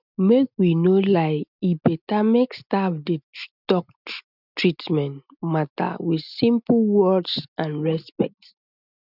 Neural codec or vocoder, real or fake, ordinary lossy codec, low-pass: none; real; none; 5.4 kHz